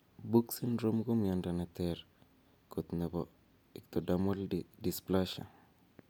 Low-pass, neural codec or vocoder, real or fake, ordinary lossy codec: none; none; real; none